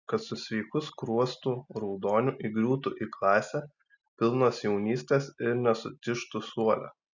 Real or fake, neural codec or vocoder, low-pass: real; none; 7.2 kHz